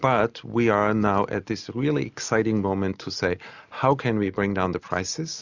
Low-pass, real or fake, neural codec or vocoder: 7.2 kHz; real; none